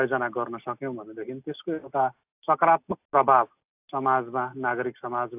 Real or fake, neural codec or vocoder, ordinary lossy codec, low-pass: real; none; AAC, 32 kbps; 3.6 kHz